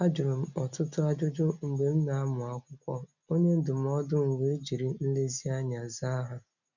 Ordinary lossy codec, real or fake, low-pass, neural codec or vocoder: none; real; 7.2 kHz; none